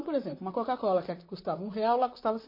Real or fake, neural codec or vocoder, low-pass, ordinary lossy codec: fake; vocoder, 44.1 kHz, 128 mel bands, Pupu-Vocoder; 5.4 kHz; MP3, 24 kbps